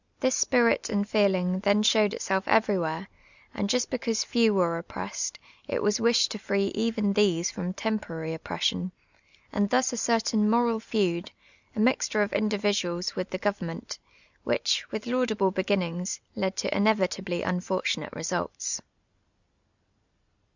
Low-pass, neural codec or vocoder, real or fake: 7.2 kHz; none; real